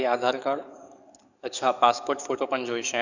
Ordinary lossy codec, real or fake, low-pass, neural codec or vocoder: none; fake; 7.2 kHz; codec, 44.1 kHz, 7.8 kbps, DAC